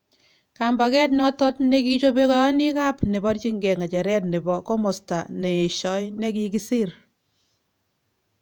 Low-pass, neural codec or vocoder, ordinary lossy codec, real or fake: 19.8 kHz; vocoder, 48 kHz, 128 mel bands, Vocos; none; fake